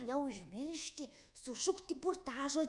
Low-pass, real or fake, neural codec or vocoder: 10.8 kHz; fake; codec, 24 kHz, 1.2 kbps, DualCodec